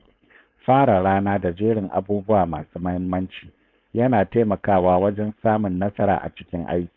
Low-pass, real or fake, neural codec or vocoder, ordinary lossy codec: 7.2 kHz; fake; codec, 16 kHz, 4.8 kbps, FACodec; none